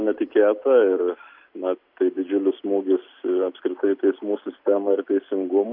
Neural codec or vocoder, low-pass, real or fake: none; 5.4 kHz; real